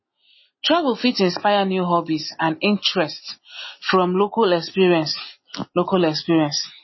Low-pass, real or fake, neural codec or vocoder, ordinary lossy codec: 7.2 kHz; real; none; MP3, 24 kbps